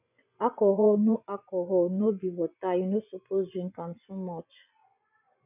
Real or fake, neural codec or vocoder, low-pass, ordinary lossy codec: fake; vocoder, 24 kHz, 100 mel bands, Vocos; 3.6 kHz; none